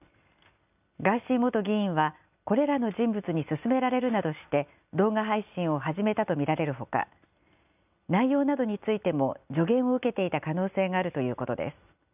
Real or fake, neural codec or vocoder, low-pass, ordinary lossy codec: real; none; 3.6 kHz; none